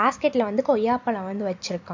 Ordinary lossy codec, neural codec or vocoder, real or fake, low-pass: MP3, 48 kbps; none; real; 7.2 kHz